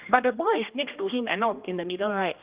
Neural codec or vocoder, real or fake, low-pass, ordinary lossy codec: codec, 16 kHz, 1 kbps, X-Codec, HuBERT features, trained on general audio; fake; 3.6 kHz; Opus, 32 kbps